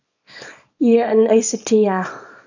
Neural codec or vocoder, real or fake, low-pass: codec, 24 kHz, 0.9 kbps, WavTokenizer, small release; fake; 7.2 kHz